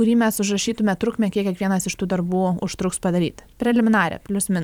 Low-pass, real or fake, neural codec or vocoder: 19.8 kHz; fake; vocoder, 44.1 kHz, 128 mel bands every 512 samples, BigVGAN v2